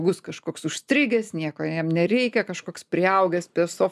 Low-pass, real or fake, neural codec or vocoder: 14.4 kHz; real; none